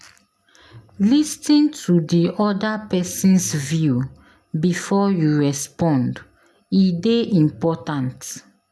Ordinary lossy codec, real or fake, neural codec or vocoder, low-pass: none; real; none; none